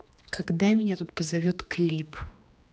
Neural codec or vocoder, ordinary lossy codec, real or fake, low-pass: codec, 16 kHz, 2 kbps, X-Codec, HuBERT features, trained on general audio; none; fake; none